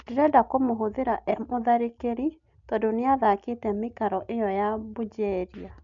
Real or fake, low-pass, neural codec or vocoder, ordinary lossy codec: real; 7.2 kHz; none; none